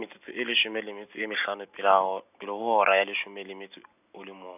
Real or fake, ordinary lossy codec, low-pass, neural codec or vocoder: real; none; 3.6 kHz; none